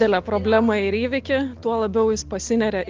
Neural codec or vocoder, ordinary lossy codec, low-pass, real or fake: none; Opus, 24 kbps; 7.2 kHz; real